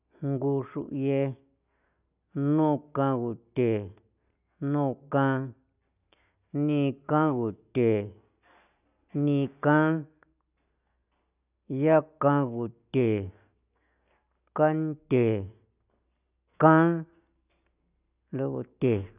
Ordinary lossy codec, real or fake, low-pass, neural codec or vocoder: none; real; 3.6 kHz; none